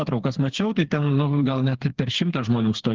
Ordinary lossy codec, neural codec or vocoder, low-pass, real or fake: Opus, 16 kbps; codec, 16 kHz, 4 kbps, FreqCodec, smaller model; 7.2 kHz; fake